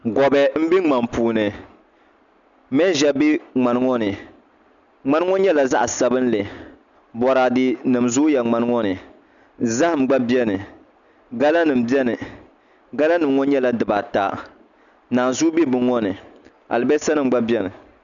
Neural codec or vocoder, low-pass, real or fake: none; 7.2 kHz; real